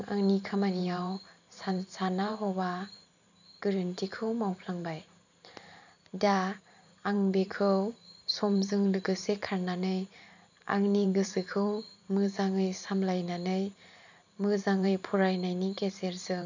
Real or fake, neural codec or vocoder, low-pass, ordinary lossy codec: fake; vocoder, 44.1 kHz, 128 mel bands every 512 samples, BigVGAN v2; 7.2 kHz; none